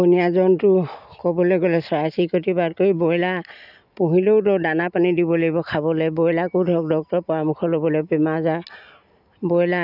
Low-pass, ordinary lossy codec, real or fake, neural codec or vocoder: 5.4 kHz; none; real; none